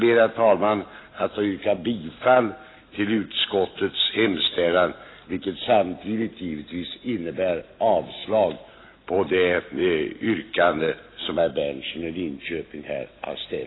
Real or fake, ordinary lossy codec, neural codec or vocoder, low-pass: real; AAC, 16 kbps; none; 7.2 kHz